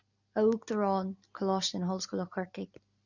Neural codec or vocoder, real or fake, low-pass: none; real; 7.2 kHz